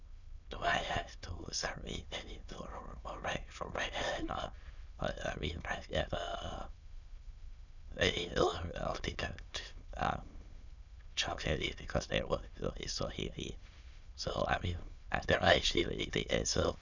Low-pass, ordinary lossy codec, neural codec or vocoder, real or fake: 7.2 kHz; none; autoencoder, 22.05 kHz, a latent of 192 numbers a frame, VITS, trained on many speakers; fake